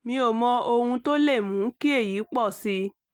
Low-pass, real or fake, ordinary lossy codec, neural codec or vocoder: 19.8 kHz; real; Opus, 32 kbps; none